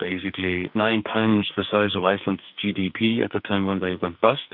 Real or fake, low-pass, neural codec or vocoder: fake; 5.4 kHz; codec, 44.1 kHz, 2.6 kbps, SNAC